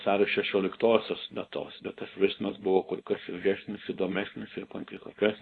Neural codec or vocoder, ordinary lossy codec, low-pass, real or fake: codec, 24 kHz, 0.9 kbps, WavTokenizer, small release; AAC, 48 kbps; 10.8 kHz; fake